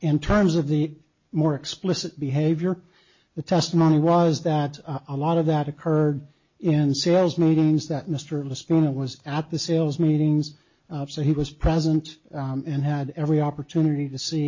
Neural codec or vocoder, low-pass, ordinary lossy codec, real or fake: none; 7.2 kHz; MP3, 32 kbps; real